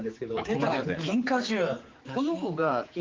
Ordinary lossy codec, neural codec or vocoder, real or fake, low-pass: Opus, 32 kbps; codec, 16 kHz, 4 kbps, X-Codec, HuBERT features, trained on general audio; fake; 7.2 kHz